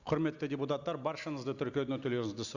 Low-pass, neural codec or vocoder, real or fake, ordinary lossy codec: 7.2 kHz; none; real; none